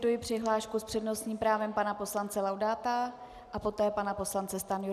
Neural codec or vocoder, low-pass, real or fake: none; 14.4 kHz; real